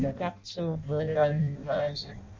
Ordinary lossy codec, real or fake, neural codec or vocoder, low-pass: MP3, 64 kbps; fake; codec, 16 kHz in and 24 kHz out, 0.6 kbps, FireRedTTS-2 codec; 7.2 kHz